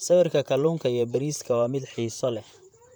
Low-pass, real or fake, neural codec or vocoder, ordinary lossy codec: none; fake; vocoder, 44.1 kHz, 128 mel bands, Pupu-Vocoder; none